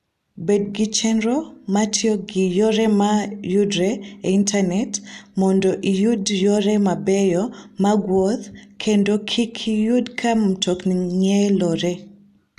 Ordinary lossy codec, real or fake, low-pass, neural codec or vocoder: AAC, 96 kbps; real; 14.4 kHz; none